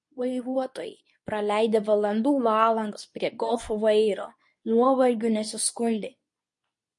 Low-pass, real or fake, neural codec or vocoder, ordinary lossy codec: 10.8 kHz; fake; codec, 24 kHz, 0.9 kbps, WavTokenizer, medium speech release version 2; MP3, 48 kbps